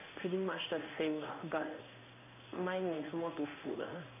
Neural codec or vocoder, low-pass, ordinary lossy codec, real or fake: codec, 16 kHz in and 24 kHz out, 1 kbps, XY-Tokenizer; 3.6 kHz; none; fake